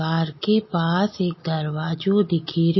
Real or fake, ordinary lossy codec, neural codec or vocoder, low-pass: real; MP3, 24 kbps; none; 7.2 kHz